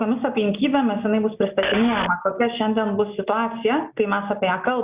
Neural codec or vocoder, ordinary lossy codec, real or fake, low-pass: none; Opus, 64 kbps; real; 3.6 kHz